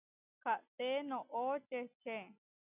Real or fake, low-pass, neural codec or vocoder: real; 3.6 kHz; none